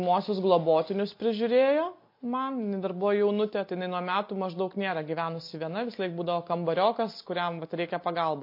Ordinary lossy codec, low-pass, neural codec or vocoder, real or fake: MP3, 32 kbps; 5.4 kHz; none; real